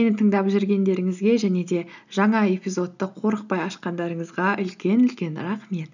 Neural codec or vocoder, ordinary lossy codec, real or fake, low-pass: none; none; real; 7.2 kHz